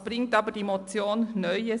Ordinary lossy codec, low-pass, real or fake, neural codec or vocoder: AAC, 96 kbps; 10.8 kHz; real; none